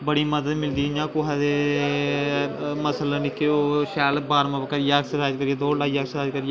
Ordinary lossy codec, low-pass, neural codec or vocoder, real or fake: none; none; none; real